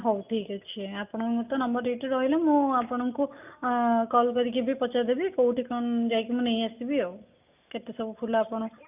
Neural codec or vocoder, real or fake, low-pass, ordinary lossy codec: none; real; 3.6 kHz; none